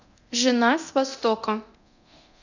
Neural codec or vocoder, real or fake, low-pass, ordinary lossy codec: codec, 24 kHz, 0.9 kbps, DualCodec; fake; 7.2 kHz; none